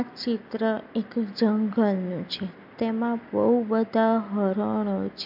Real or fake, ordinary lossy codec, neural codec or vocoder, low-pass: real; MP3, 48 kbps; none; 5.4 kHz